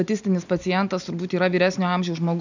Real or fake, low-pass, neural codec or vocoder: real; 7.2 kHz; none